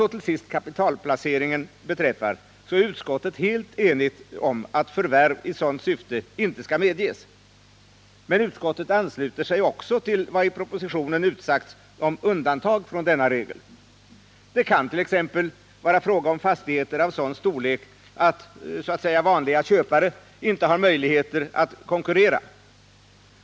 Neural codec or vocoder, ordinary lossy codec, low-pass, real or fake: none; none; none; real